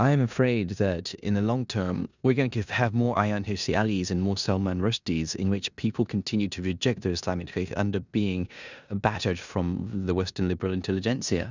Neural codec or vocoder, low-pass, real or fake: codec, 16 kHz in and 24 kHz out, 0.9 kbps, LongCat-Audio-Codec, four codebook decoder; 7.2 kHz; fake